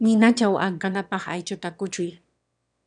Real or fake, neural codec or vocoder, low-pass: fake; autoencoder, 22.05 kHz, a latent of 192 numbers a frame, VITS, trained on one speaker; 9.9 kHz